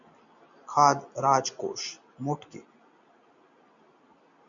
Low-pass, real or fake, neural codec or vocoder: 7.2 kHz; real; none